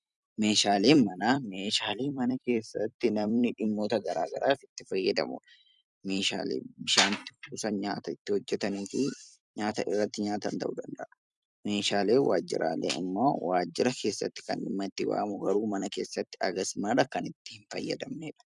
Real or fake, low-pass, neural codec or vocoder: fake; 10.8 kHz; vocoder, 44.1 kHz, 128 mel bands every 256 samples, BigVGAN v2